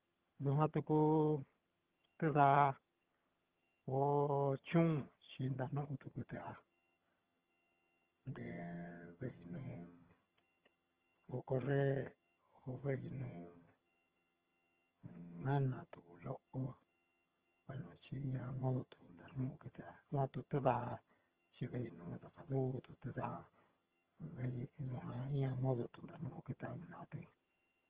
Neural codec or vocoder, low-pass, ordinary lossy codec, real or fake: vocoder, 22.05 kHz, 80 mel bands, HiFi-GAN; 3.6 kHz; Opus, 16 kbps; fake